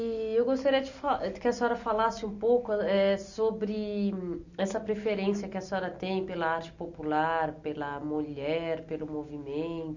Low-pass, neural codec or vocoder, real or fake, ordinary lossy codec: 7.2 kHz; none; real; none